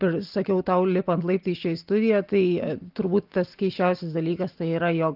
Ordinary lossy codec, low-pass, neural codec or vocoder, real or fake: Opus, 32 kbps; 5.4 kHz; none; real